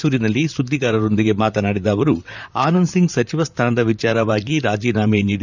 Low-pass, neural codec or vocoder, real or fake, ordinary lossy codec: 7.2 kHz; vocoder, 44.1 kHz, 128 mel bands, Pupu-Vocoder; fake; none